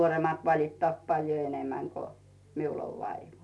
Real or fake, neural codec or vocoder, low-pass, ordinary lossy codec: real; none; none; none